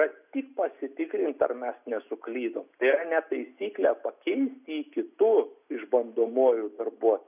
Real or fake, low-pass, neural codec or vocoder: real; 3.6 kHz; none